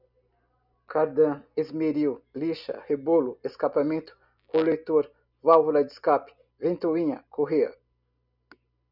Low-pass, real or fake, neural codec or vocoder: 5.4 kHz; real; none